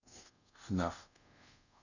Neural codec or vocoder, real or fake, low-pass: codec, 24 kHz, 0.5 kbps, DualCodec; fake; 7.2 kHz